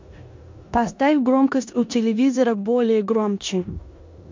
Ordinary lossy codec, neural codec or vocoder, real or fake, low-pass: MP3, 64 kbps; codec, 16 kHz in and 24 kHz out, 0.9 kbps, LongCat-Audio-Codec, four codebook decoder; fake; 7.2 kHz